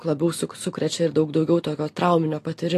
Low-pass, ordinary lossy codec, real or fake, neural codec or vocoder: 14.4 kHz; AAC, 48 kbps; real; none